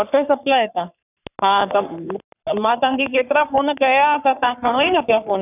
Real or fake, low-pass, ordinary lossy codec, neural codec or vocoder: fake; 3.6 kHz; none; codec, 44.1 kHz, 3.4 kbps, Pupu-Codec